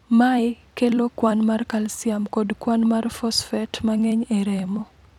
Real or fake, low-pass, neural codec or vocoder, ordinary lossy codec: fake; 19.8 kHz; vocoder, 44.1 kHz, 128 mel bands every 256 samples, BigVGAN v2; none